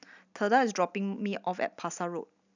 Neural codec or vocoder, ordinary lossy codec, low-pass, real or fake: none; none; 7.2 kHz; real